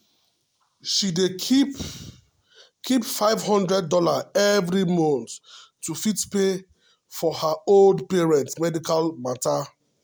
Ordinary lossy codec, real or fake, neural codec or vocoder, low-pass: none; real; none; none